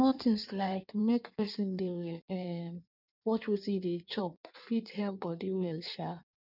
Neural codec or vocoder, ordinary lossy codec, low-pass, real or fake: codec, 16 kHz in and 24 kHz out, 1.1 kbps, FireRedTTS-2 codec; none; 5.4 kHz; fake